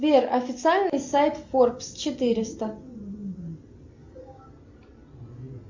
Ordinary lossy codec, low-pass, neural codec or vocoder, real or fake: MP3, 64 kbps; 7.2 kHz; none; real